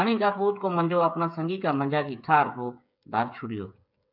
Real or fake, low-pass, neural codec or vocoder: fake; 5.4 kHz; codec, 16 kHz, 8 kbps, FreqCodec, smaller model